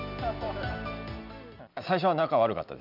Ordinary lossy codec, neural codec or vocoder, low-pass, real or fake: none; none; 5.4 kHz; real